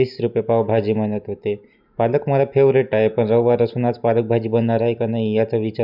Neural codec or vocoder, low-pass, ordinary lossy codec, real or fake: none; 5.4 kHz; none; real